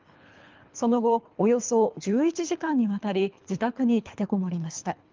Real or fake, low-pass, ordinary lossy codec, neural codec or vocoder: fake; 7.2 kHz; Opus, 24 kbps; codec, 24 kHz, 3 kbps, HILCodec